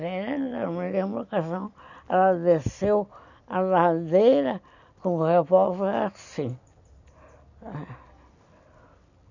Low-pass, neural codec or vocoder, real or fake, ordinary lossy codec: 7.2 kHz; none; real; none